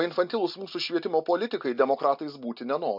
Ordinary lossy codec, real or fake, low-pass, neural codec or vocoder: MP3, 48 kbps; real; 5.4 kHz; none